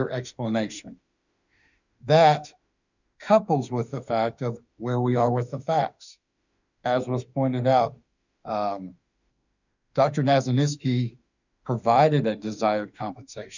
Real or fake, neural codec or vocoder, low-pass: fake; autoencoder, 48 kHz, 32 numbers a frame, DAC-VAE, trained on Japanese speech; 7.2 kHz